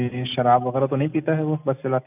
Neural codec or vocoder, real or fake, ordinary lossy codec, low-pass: none; real; none; 3.6 kHz